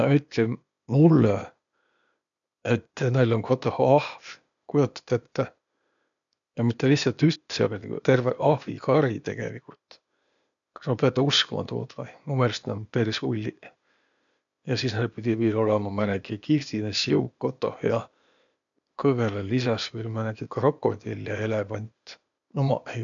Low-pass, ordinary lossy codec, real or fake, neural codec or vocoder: 7.2 kHz; none; fake; codec, 16 kHz, 0.8 kbps, ZipCodec